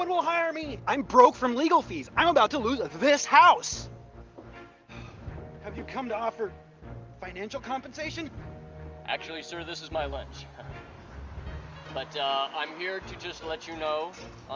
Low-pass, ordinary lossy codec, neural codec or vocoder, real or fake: 7.2 kHz; Opus, 32 kbps; none; real